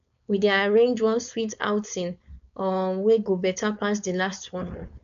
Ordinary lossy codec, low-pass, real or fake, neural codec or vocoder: none; 7.2 kHz; fake; codec, 16 kHz, 4.8 kbps, FACodec